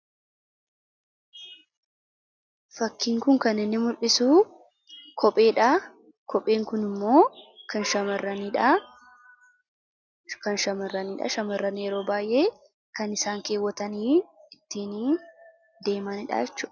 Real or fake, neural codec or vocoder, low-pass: real; none; 7.2 kHz